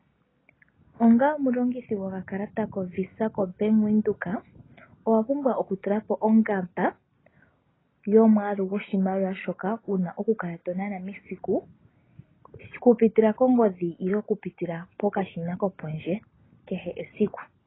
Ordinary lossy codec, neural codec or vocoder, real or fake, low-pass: AAC, 16 kbps; none; real; 7.2 kHz